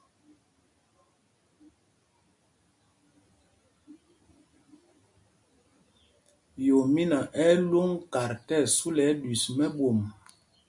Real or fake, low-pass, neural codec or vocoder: real; 10.8 kHz; none